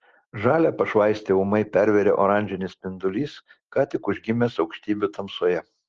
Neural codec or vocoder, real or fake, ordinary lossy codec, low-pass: none; real; Opus, 16 kbps; 7.2 kHz